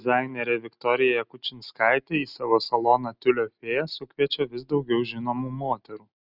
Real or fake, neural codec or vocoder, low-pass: real; none; 5.4 kHz